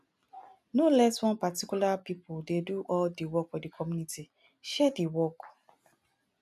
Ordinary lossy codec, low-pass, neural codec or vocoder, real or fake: none; 14.4 kHz; none; real